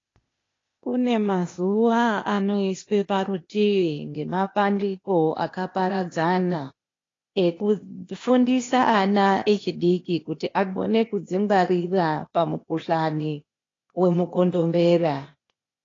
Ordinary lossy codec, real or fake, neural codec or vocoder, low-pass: AAC, 32 kbps; fake; codec, 16 kHz, 0.8 kbps, ZipCodec; 7.2 kHz